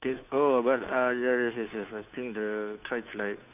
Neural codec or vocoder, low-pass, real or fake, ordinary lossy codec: codec, 16 kHz, 2 kbps, FunCodec, trained on Chinese and English, 25 frames a second; 3.6 kHz; fake; none